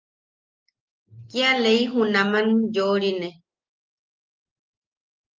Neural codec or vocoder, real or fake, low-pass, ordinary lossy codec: none; real; 7.2 kHz; Opus, 32 kbps